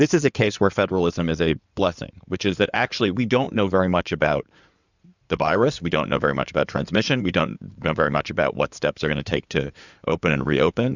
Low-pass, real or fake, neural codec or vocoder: 7.2 kHz; fake; codec, 16 kHz in and 24 kHz out, 2.2 kbps, FireRedTTS-2 codec